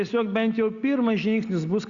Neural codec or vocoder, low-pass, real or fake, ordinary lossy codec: none; 7.2 kHz; real; Opus, 64 kbps